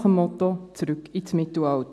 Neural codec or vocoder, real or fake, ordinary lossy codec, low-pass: none; real; none; none